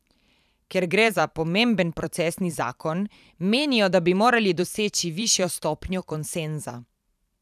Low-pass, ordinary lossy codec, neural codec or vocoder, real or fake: 14.4 kHz; none; vocoder, 44.1 kHz, 128 mel bands every 512 samples, BigVGAN v2; fake